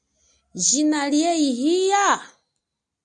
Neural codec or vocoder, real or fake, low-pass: none; real; 9.9 kHz